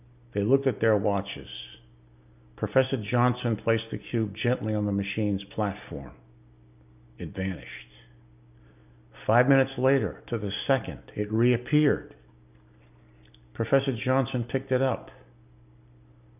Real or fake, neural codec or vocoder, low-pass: real; none; 3.6 kHz